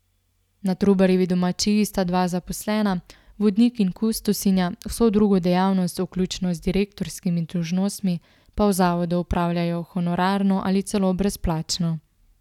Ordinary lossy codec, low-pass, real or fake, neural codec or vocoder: none; 19.8 kHz; real; none